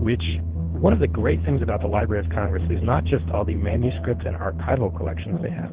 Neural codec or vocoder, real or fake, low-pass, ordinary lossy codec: codec, 24 kHz, 3 kbps, HILCodec; fake; 3.6 kHz; Opus, 16 kbps